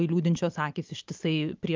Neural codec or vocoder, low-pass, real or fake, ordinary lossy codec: none; 7.2 kHz; real; Opus, 24 kbps